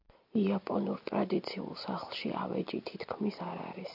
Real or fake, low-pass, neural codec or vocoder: real; 5.4 kHz; none